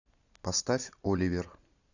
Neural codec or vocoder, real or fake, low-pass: none; real; 7.2 kHz